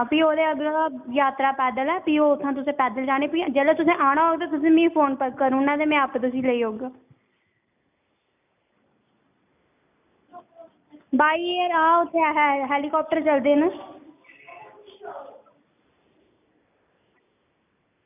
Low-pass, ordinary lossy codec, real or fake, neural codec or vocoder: 3.6 kHz; none; real; none